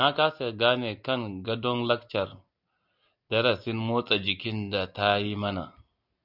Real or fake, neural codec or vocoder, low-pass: real; none; 5.4 kHz